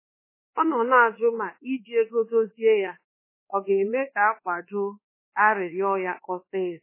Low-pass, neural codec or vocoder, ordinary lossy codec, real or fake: 3.6 kHz; codec, 24 kHz, 1.2 kbps, DualCodec; MP3, 16 kbps; fake